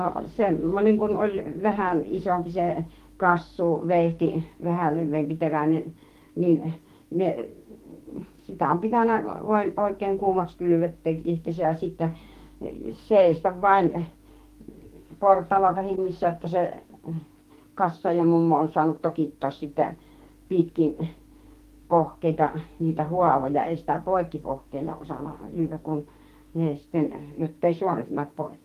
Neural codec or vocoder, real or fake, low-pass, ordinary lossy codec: codec, 44.1 kHz, 2.6 kbps, SNAC; fake; 14.4 kHz; Opus, 24 kbps